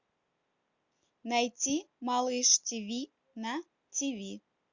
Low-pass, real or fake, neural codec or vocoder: 7.2 kHz; real; none